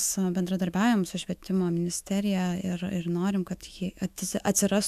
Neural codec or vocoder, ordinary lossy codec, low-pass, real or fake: autoencoder, 48 kHz, 128 numbers a frame, DAC-VAE, trained on Japanese speech; AAC, 96 kbps; 14.4 kHz; fake